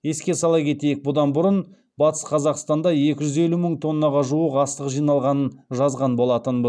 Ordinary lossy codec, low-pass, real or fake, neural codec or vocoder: none; 9.9 kHz; real; none